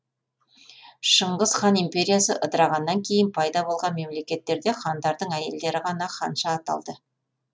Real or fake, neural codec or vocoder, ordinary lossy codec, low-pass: real; none; none; none